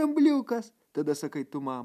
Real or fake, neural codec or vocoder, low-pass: real; none; 14.4 kHz